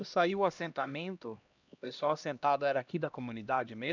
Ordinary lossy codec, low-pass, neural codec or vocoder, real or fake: none; 7.2 kHz; codec, 16 kHz, 1 kbps, X-Codec, HuBERT features, trained on LibriSpeech; fake